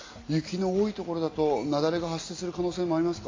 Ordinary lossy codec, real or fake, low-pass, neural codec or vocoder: AAC, 32 kbps; real; 7.2 kHz; none